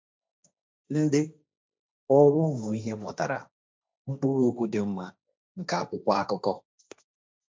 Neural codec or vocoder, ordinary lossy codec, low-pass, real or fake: codec, 16 kHz, 1.1 kbps, Voila-Tokenizer; none; none; fake